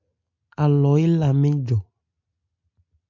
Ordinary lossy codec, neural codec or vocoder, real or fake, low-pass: MP3, 48 kbps; none; real; 7.2 kHz